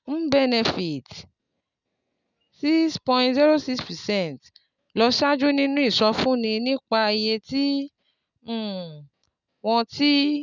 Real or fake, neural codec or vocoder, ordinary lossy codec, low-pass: real; none; none; 7.2 kHz